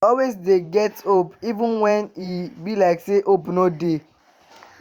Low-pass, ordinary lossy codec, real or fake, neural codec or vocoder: 19.8 kHz; Opus, 64 kbps; fake; vocoder, 44.1 kHz, 128 mel bands every 512 samples, BigVGAN v2